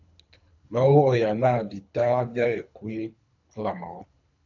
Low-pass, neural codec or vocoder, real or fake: 7.2 kHz; codec, 24 kHz, 3 kbps, HILCodec; fake